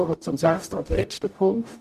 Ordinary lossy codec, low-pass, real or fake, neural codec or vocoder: none; 14.4 kHz; fake; codec, 44.1 kHz, 0.9 kbps, DAC